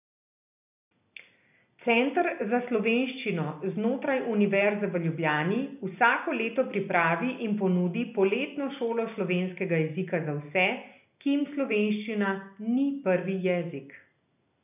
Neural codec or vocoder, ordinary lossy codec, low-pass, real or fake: none; none; 3.6 kHz; real